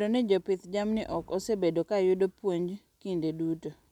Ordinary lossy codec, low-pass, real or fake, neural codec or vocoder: none; 19.8 kHz; real; none